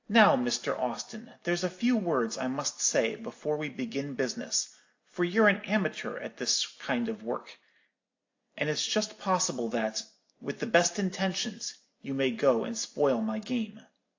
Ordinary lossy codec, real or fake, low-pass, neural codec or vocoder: AAC, 48 kbps; real; 7.2 kHz; none